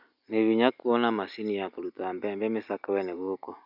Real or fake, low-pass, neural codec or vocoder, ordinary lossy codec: real; 5.4 kHz; none; none